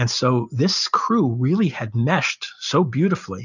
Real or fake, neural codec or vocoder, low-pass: real; none; 7.2 kHz